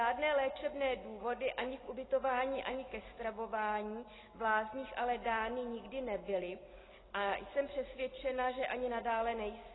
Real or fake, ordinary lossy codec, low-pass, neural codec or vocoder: real; AAC, 16 kbps; 7.2 kHz; none